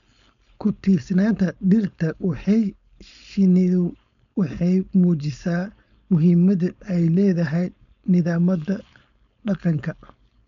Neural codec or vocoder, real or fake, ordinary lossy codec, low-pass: codec, 16 kHz, 4.8 kbps, FACodec; fake; none; 7.2 kHz